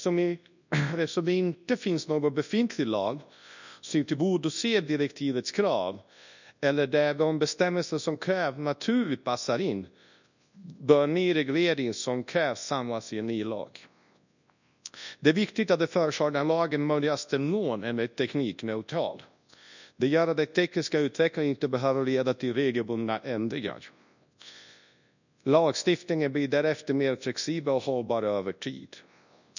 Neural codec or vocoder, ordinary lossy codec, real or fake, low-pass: codec, 24 kHz, 0.9 kbps, WavTokenizer, large speech release; none; fake; 7.2 kHz